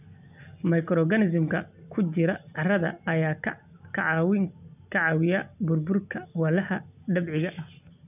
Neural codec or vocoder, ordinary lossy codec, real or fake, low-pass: none; none; real; 3.6 kHz